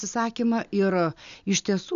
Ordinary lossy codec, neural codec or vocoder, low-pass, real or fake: MP3, 96 kbps; none; 7.2 kHz; real